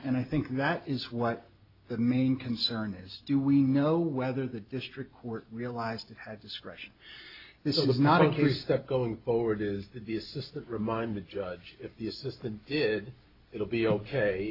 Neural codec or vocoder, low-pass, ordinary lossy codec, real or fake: none; 5.4 kHz; AAC, 32 kbps; real